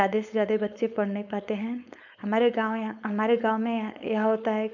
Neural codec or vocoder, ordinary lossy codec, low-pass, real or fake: codec, 16 kHz, 4.8 kbps, FACodec; none; 7.2 kHz; fake